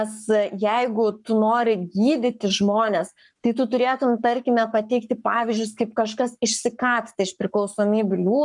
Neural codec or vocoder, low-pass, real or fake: codec, 44.1 kHz, 7.8 kbps, Pupu-Codec; 10.8 kHz; fake